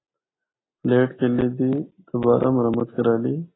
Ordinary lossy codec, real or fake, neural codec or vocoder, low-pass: AAC, 16 kbps; real; none; 7.2 kHz